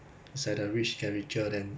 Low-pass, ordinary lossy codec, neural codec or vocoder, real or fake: none; none; none; real